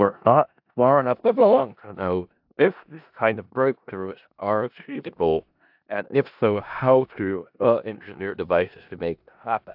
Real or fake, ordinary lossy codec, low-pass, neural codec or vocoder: fake; AAC, 48 kbps; 5.4 kHz; codec, 16 kHz in and 24 kHz out, 0.4 kbps, LongCat-Audio-Codec, four codebook decoder